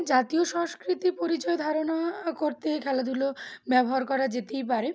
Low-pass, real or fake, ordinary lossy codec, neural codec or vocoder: none; real; none; none